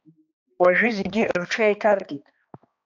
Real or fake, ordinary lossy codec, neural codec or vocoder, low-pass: fake; AAC, 48 kbps; codec, 16 kHz, 2 kbps, X-Codec, HuBERT features, trained on balanced general audio; 7.2 kHz